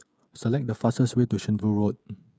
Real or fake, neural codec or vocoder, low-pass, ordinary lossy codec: fake; codec, 16 kHz, 16 kbps, FreqCodec, smaller model; none; none